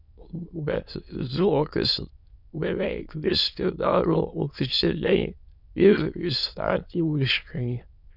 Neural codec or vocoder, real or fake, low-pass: autoencoder, 22.05 kHz, a latent of 192 numbers a frame, VITS, trained on many speakers; fake; 5.4 kHz